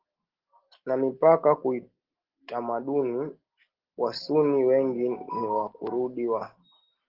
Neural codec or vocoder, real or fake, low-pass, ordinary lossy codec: none; real; 5.4 kHz; Opus, 16 kbps